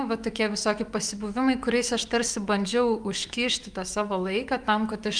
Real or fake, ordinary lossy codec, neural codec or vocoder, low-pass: fake; Opus, 32 kbps; autoencoder, 48 kHz, 128 numbers a frame, DAC-VAE, trained on Japanese speech; 9.9 kHz